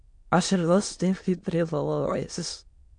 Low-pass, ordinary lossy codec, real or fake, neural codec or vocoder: 9.9 kHz; AAC, 64 kbps; fake; autoencoder, 22.05 kHz, a latent of 192 numbers a frame, VITS, trained on many speakers